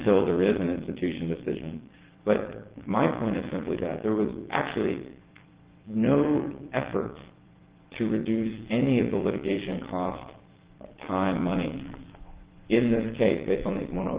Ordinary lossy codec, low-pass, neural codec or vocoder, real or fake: Opus, 16 kbps; 3.6 kHz; vocoder, 22.05 kHz, 80 mel bands, WaveNeXt; fake